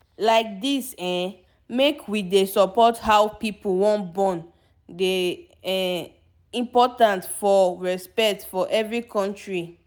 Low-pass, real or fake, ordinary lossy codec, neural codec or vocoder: none; real; none; none